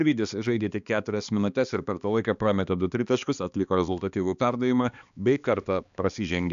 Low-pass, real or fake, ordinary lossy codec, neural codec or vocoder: 7.2 kHz; fake; AAC, 96 kbps; codec, 16 kHz, 2 kbps, X-Codec, HuBERT features, trained on balanced general audio